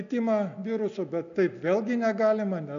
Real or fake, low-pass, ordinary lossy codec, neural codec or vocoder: real; 7.2 kHz; AAC, 96 kbps; none